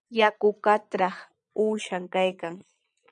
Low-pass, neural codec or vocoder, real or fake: 9.9 kHz; vocoder, 22.05 kHz, 80 mel bands, Vocos; fake